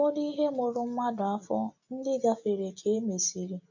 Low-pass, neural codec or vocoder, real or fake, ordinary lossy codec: 7.2 kHz; none; real; MP3, 48 kbps